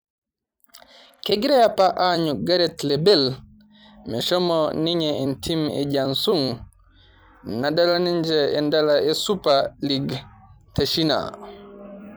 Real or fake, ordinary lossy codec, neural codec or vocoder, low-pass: fake; none; vocoder, 44.1 kHz, 128 mel bands every 256 samples, BigVGAN v2; none